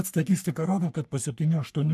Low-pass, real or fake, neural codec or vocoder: 14.4 kHz; fake; codec, 44.1 kHz, 3.4 kbps, Pupu-Codec